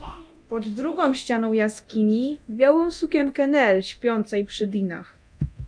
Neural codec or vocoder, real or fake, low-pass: codec, 24 kHz, 0.9 kbps, DualCodec; fake; 9.9 kHz